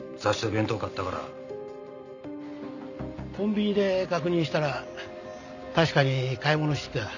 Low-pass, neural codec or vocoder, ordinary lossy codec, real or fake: 7.2 kHz; none; none; real